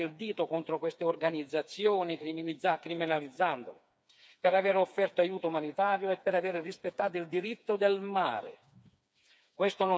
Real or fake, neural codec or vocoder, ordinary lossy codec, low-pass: fake; codec, 16 kHz, 4 kbps, FreqCodec, smaller model; none; none